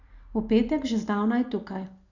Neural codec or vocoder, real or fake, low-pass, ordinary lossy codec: none; real; 7.2 kHz; none